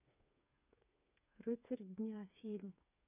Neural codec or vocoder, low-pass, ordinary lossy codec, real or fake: codec, 16 kHz, 4 kbps, FreqCodec, smaller model; 3.6 kHz; none; fake